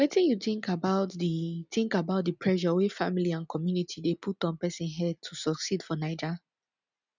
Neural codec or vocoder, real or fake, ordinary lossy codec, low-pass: none; real; none; 7.2 kHz